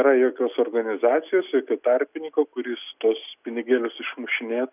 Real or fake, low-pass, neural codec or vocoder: real; 3.6 kHz; none